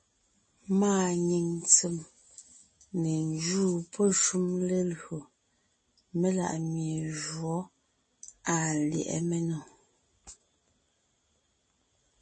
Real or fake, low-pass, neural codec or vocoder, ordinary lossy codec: real; 10.8 kHz; none; MP3, 32 kbps